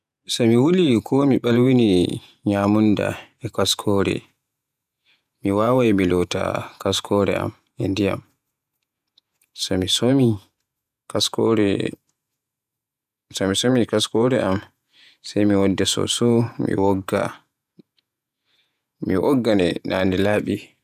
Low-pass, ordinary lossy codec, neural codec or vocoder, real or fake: 14.4 kHz; none; vocoder, 48 kHz, 128 mel bands, Vocos; fake